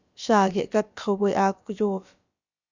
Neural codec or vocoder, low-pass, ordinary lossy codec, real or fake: codec, 16 kHz, about 1 kbps, DyCAST, with the encoder's durations; 7.2 kHz; Opus, 64 kbps; fake